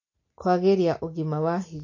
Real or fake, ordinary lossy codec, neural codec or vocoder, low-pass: real; MP3, 32 kbps; none; 7.2 kHz